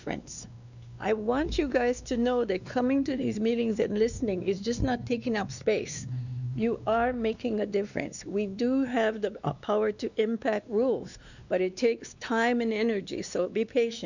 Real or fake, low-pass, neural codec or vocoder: fake; 7.2 kHz; codec, 16 kHz, 2 kbps, X-Codec, WavLM features, trained on Multilingual LibriSpeech